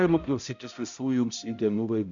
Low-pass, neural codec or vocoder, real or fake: 7.2 kHz; codec, 16 kHz, 0.5 kbps, X-Codec, HuBERT features, trained on balanced general audio; fake